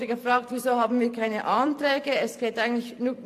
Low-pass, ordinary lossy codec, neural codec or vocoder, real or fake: 14.4 kHz; AAC, 64 kbps; vocoder, 44.1 kHz, 128 mel bands every 512 samples, BigVGAN v2; fake